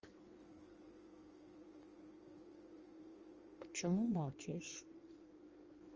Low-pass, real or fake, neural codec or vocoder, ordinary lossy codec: 7.2 kHz; fake; codec, 16 kHz in and 24 kHz out, 1.1 kbps, FireRedTTS-2 codec; Opus, 24 kbps